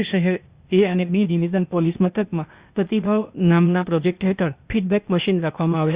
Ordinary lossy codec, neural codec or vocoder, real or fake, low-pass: Opus, 64 kbps; codec, 16 kHz, 0.8 kbps, ZipCodec; fake; 3.6 kHz